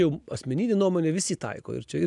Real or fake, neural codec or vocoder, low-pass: real; none; 10.8 kHz